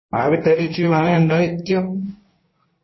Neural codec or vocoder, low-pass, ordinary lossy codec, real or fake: codec, 16 kHz in and 24 kHz out, 1.1 kbps, FireRedTTS-2 codec; 7.2 kHz; MP3, 24 kbps; fake